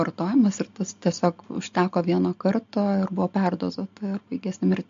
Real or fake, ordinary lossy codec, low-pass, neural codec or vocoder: real; MP3, 48 kbps; 7.2 kHz; none